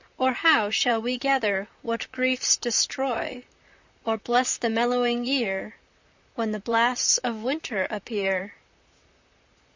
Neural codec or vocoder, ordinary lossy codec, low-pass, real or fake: vocoder, 44.1 kHz, 128 mel bands, Pupu-Vocoder; Opus, 64 kbps; 7.2 kHz; fake